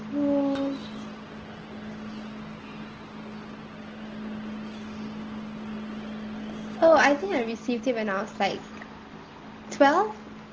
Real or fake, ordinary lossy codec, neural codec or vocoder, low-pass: real; Opus, 16 kbps; none; 7.2 kHz